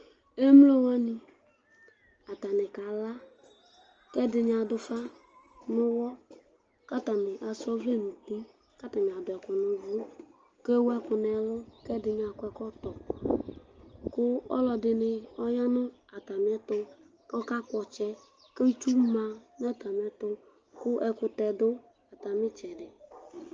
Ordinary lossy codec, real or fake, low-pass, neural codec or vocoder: Opus, 24 kbps; real; 7.2 kHz; none